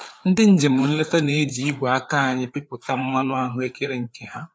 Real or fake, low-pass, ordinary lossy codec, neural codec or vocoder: fake; none; none; codec, 16 kHz, 4 kbps, FreqCodec, larger model